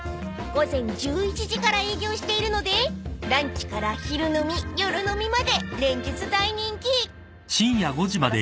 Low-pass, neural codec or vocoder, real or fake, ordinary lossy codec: none; none; real; none